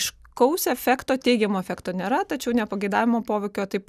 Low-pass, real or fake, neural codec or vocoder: 14.4 kHz; real; none